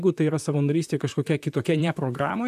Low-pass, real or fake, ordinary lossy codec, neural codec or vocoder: 14.4 kHz; fake; AAC, 96 kbps; vocoder, 44.1 kHz, 128 mel bands, Pupu-Vocoder